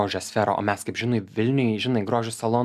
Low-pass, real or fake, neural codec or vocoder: 14.4 kHz; real; none